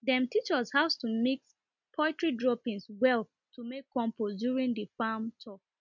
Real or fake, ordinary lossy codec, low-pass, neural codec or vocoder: real; none; 7.2 kHz; none